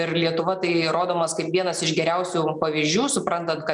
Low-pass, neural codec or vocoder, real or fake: 10.8 kHz; none; real